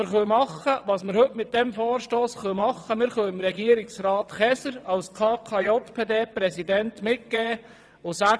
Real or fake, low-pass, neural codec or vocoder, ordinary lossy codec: fake; none; vocoder, 22.05 kHz, 80 mel bands, WaveNeXt; none